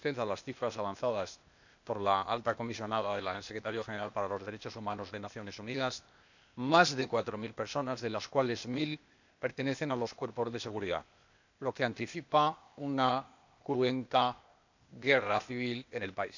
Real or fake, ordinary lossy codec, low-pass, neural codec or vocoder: fake; none; 7.2 kHz; codec, 16 kHz, 0.8 kbps, ZipCodec